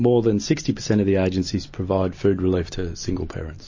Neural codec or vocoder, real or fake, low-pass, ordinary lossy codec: none; real; 7.2 kHz; MP3, 32 kbps